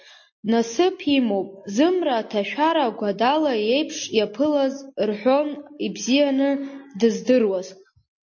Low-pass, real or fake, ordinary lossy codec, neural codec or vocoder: 7.2 kHz; real; MP3, 32 kbps; none